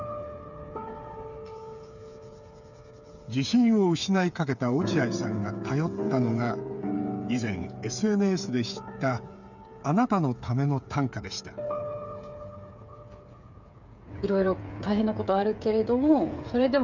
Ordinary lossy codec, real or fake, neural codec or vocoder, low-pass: none; fake; codec, 16 kHz, 8 kbps, FreqCodec, smaller model; 7.2 kHz